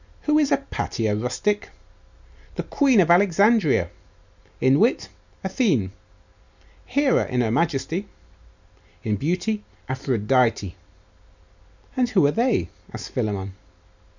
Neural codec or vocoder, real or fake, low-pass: none; real; 7.2 kHz